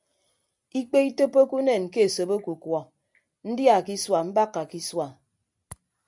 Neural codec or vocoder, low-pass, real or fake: none; 10.8 kHz; real